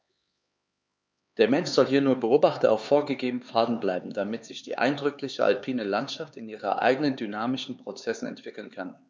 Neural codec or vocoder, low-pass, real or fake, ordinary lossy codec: codec, 16 kHz, 4 kbps, X-Codec, HuBERT features, trained on LibriSpeech; none; fake; none